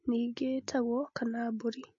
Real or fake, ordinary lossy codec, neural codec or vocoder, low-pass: real; MP3, 32 kbps; none; 7.2 kHz